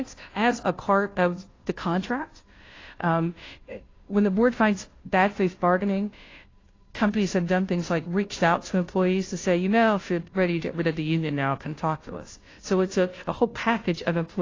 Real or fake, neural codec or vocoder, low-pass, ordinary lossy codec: fake; codec, 16 kHz, 0.5 kbps, FunCodec, trained on Chinese and English, 25 frames a second; 7.2 kHz; AAC, 32 kbps